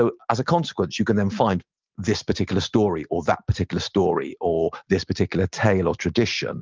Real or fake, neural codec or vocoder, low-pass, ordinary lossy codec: real; none; 7.2 kHz; Opus, 24 kbps